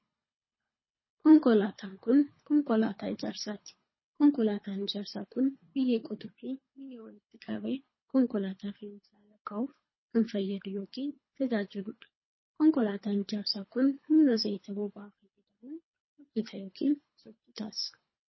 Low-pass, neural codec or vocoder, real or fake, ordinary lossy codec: 7.2 kHz; codec, 24 kHz, 3 kbps, HILCodec; fake; MP3, 24 kbps